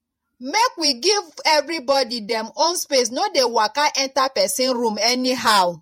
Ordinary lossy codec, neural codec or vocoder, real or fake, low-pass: MP3, 64 kbps; vocoder, 48 kHz, 128 mel bands, Vocos; fake; 19.8 kHz